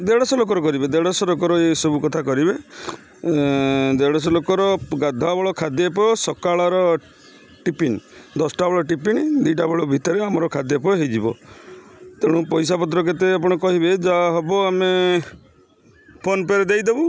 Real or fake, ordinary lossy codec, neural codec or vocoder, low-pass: real; none; none; none